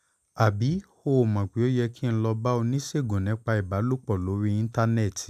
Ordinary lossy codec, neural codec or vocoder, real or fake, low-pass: none; none; real; 14.4 kHz